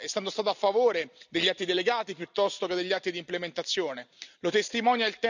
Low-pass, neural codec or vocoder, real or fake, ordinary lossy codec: 7.2 kHz; none; real; none